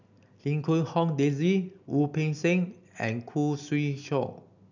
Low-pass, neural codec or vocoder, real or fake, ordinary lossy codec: 7.2 kHz; none; real; none